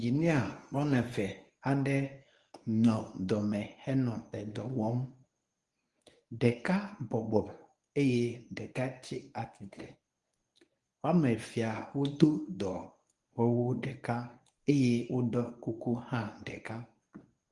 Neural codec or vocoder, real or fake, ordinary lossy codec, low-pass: codec, 24 kHz, 0.9 kbps, WavTokenizer, medium speech release version 1; fake; Opus, 32 kbps; 10.8 kHz